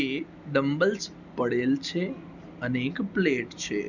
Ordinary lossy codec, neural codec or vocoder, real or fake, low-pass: none; none; real; 7.2 kHz